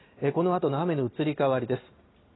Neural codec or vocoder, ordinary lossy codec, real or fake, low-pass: none; AAC, 16 kbps; real; 7.2 kHz